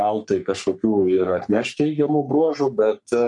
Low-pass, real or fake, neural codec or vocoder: 10.8 kHz; fake; codec, 44.1 kHz, 3.4 kbps, Pupu-Codec